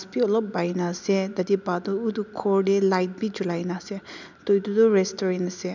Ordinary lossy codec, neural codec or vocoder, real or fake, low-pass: none; none; real; 7.2 kHz